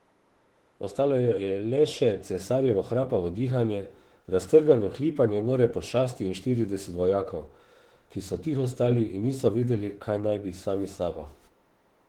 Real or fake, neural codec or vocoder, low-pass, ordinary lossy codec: fake; autoencoder, 48 kHz, 32 numbers a frame, DAC-VAE, trained on Japanese speech; 19.8 kHz; Opus, 16 kbps